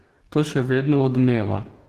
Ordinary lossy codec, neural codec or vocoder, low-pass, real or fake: Opus, 16 kbps; codec, 44.1 kHz, 2.6 kbps, DAC; 14.4 kHz; fake